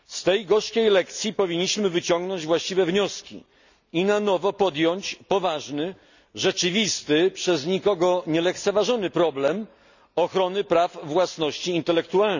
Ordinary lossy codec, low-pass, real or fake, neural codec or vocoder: none; 7.2 kHz; real; none